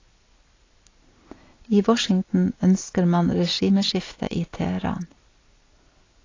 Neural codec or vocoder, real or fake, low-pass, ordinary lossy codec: none; real; 7.2 kHz; AAC, 32 kbps